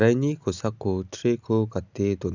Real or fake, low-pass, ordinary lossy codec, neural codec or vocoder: real; 7.2 kHz; none; none